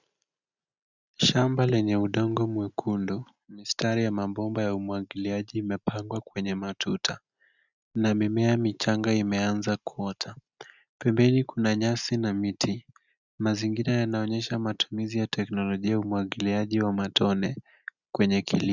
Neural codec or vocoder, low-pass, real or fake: none; 7.2 kHz; real